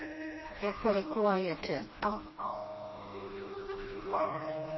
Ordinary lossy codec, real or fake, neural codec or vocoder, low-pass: MP3, 24 kbps; fake; codec, 16 kHz, 1 kbps, FreqCodec, smaller model; 7.2 kHz